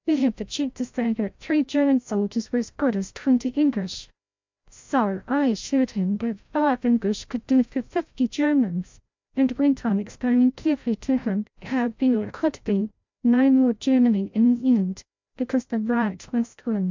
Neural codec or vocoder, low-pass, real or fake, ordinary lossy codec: codec, 16 kHz, 0.5 kbps, FreqCodec, larger model; 7.2 kHz; fake; MP3, 64 kbps